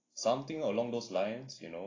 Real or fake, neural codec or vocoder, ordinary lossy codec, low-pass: real; none; AAC, 32 kbps; 7.2 kHz